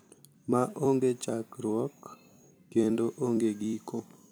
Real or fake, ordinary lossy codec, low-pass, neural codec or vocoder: fake; none; none; vocoder, 44.1 kHz, 128 mel bands every 256 samples, BigVGAN v2